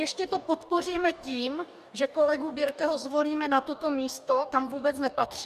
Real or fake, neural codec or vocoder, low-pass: fake; codec, 44.1 kHz, 2.6 kbps, DAC; 14.4 kHz